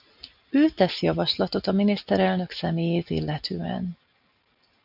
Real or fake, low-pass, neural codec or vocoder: real; 5.4 kHz; none